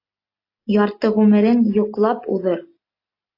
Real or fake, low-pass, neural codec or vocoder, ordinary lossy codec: real; 5.4 kHz; none; AAC, 32 kbps